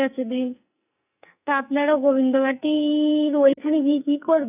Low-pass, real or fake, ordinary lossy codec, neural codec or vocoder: 3.6 kHz; fake; none; codec, 44.1 kHz, 2.6 kbps, SNAC